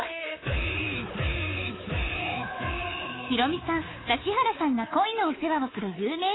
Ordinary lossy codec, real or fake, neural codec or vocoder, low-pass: AAC, 16 kbps; fake; codec, 24 kHz, 3.1 kbps, DualCodec; 7.2 kHz